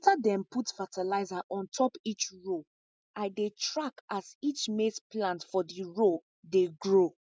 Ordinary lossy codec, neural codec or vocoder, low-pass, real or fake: none; none; none; real